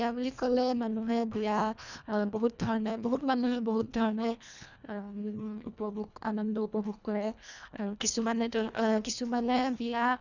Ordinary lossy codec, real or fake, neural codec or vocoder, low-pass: none; fake; codec, 24 kHz, 1.5 kbps, HILCodec; 7.2 kHz